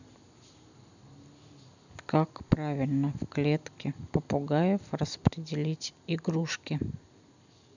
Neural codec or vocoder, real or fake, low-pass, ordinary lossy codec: none; real; 7.2 kHz; none